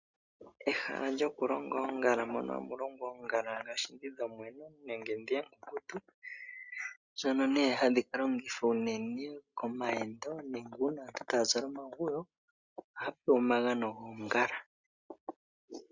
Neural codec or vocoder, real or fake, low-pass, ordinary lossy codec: none; real; 7.2 kHz; Opus, 64 kbps